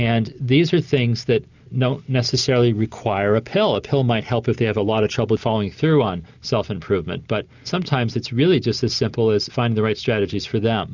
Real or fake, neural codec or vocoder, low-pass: real; none; 7.2 kHz